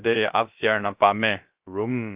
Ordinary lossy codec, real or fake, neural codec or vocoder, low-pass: Opus, 32 kbps; fake; codec, 16 kHz, 0.3 kbps, FocalCodec; 3.6 kHz